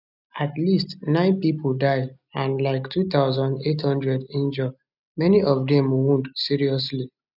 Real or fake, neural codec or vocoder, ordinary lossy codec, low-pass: real; none; none; 5.4 kHz